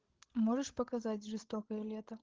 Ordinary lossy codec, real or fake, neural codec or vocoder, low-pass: Opus, 16 kbps; fake; codec, 16 kHz, 16 kbps, FreqCodec, larger model; 7.2 kHz